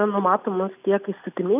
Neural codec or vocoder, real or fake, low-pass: none; real; 3.6 kHz